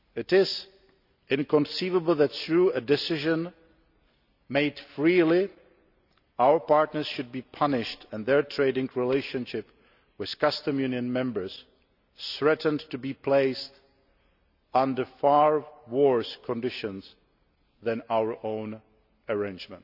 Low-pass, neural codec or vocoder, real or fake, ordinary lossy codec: 5.4 kHz; none; real; none